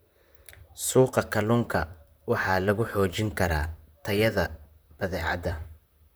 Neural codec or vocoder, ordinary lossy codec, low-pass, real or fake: vocoder, 44.1 kHz, 128 mel bands, Pupu-Vocoder; none; none; fake